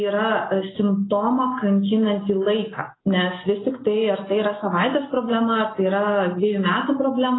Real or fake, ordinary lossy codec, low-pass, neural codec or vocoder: real; AAC, 16 kbps; 7.2 kHz; none